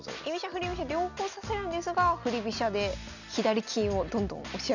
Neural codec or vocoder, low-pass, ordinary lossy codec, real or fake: none; 7.2 kHz; none; real